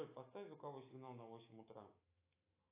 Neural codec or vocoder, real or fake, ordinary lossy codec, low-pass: autoencoder, 48 kHz, 128 numbers a frame, DAC-VAE, trained on Japanese speech; fake; AAC, 24 kbps; 3.6 kHz